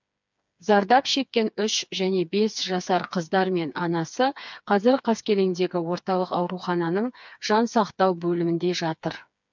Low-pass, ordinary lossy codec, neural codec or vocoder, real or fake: 7.2 kHz; MP3, 64 kbps; codec, 16 kHz, 4 kbps, FreqCodec, smaller model; fake